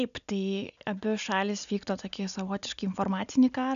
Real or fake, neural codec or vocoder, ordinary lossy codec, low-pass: real; none; MP3, 96 kbps; 7.2 kHz